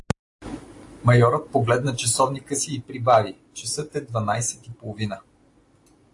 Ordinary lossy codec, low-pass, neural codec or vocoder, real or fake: AAC, 48 kbps; 10.8 kHz; none; real